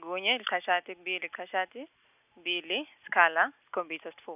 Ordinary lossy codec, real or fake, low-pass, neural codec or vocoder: none; real; 3.6 kHz; none